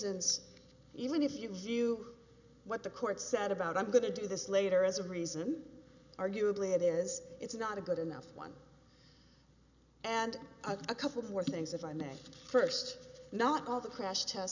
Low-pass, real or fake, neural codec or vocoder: 7.2 kHz; real; none